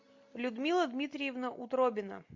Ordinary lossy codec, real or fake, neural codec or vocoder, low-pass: MP3, 48 kbps; real; none; 7.2 kHz